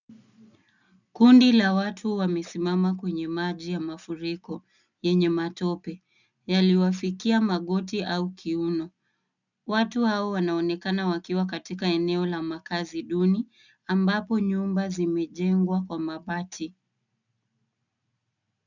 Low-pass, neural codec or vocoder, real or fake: 7.2 kHz; none; real